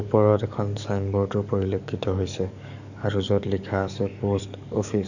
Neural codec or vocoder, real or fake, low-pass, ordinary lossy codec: codec, 44.1 kHz, 7.8 kbps, DAC; fake; 7.2 kHz; none